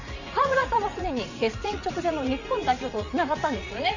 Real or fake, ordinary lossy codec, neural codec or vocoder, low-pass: fake; none; vocoder, 44.1 kHz, 80 mel bands, Vocos; 7.2 kHz